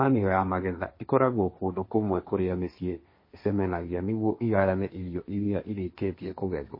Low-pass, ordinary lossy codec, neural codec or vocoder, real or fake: 5.4 kHz; MP3, 24 kbps; codec, 16 kHz, 1.1 kbps, Voila-Tokenizer; fake